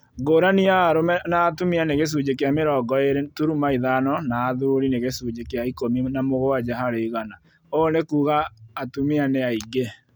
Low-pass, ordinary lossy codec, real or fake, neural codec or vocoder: none; none; real; none